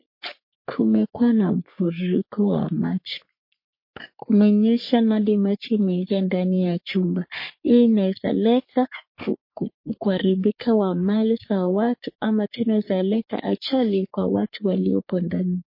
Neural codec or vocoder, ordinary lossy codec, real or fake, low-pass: codec, 44.1 kHz, 3.4 kbps, Pupu-Codec; MP3, 32 kbps; fake; 5.4 kHz